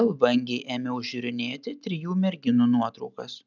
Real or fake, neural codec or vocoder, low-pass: real; none; 7.2 kHz